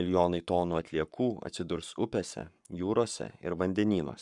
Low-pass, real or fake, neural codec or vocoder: 10.8 kHz; fake; codec, 44.1 kHz, 7.8 kbps, Pupu-Codec